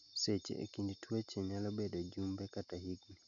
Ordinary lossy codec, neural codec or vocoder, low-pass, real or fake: none; none; 7.2 kHz; real